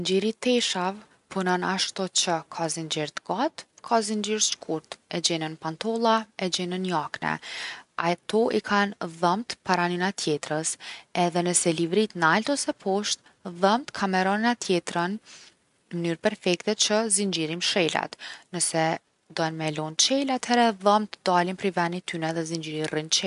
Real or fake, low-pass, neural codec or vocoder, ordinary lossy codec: real; 10.8 kHz; none; none